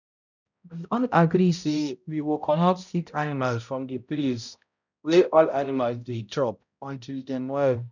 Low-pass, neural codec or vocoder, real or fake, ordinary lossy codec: 7.2 kHz; codec, 16 kHz, 0.5 kbps, X-Codec, HuBERT features, trained on balanced general audio; fake; none